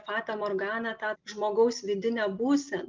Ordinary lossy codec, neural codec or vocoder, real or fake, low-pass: Opus, 24 kbps; none; real; 7.2 kHz